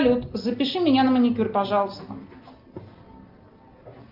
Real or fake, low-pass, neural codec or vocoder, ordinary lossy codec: real; 5.4 kHz; none; Opus, 32 kbps